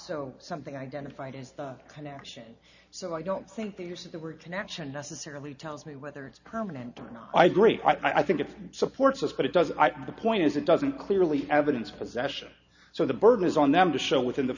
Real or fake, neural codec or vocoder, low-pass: real; none; 7.2 kHz